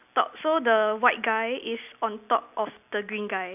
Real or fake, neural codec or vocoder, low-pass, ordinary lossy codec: real; none; 3.6 kHz; none